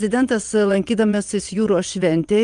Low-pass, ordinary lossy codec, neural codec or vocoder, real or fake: 9.9 kHz; Opus, 32 kbps; vocoder, 22.05 kHz, 80 mel bands, WaveNeXt; fake